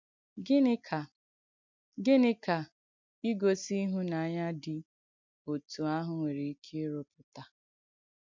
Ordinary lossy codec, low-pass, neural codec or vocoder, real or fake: MP3, 64 kbps; 7.2 kHz; none; real